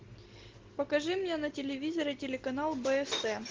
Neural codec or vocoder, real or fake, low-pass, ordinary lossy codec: none; real; 7.2 kHz; Opus, 16 kbps